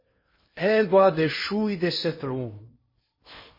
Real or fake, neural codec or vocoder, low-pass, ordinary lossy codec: fake; codec, 16 kHz in and 24 kHz out, 0.8 kbps, FocalCodec, streaming, 65536 codes; 5.4 kHz; MP3, 24 kbps